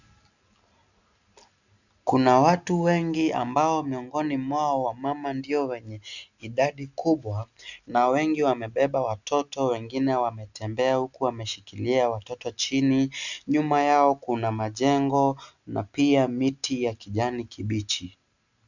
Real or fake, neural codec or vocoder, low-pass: real; none; 7.2 kHz